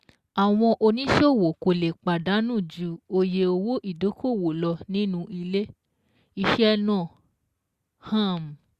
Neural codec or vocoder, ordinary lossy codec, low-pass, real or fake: none; Opus, 64 kbps; 14.4 kHz; real